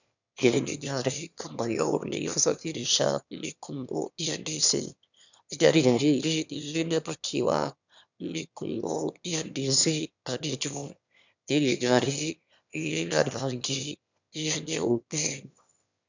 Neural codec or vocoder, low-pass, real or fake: autoencoder, 22.05 kHz, a latent of 192 numbers a frame, VITS, trained on one speaker; 7.2 kHz; fake